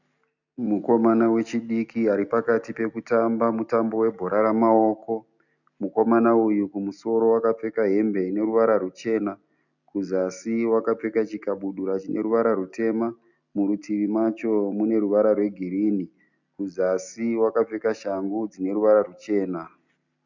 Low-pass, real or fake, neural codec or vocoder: 7.2 kHz; real; none